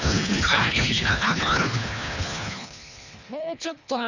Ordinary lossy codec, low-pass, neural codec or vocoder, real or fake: none; 7.2 kHz; codec, 24 kHz, 1.5 kbps, HILCodec; fake